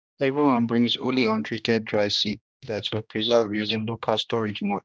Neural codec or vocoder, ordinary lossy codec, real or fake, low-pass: codec, 16 kHz, 1 kbps, X-Codec, HuBERT features, trained on general audio; none; fake; none